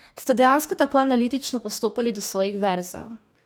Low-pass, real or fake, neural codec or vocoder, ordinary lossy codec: none; fake; codec, 44.1 kHz, 2.6 kbps, DAC; none